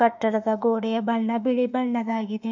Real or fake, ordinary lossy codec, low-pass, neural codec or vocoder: fake; none; 7.2 kHz; autoencoder, 48 kHz, 32 numbers a frame, DAC-VAE, trained on Japanese speech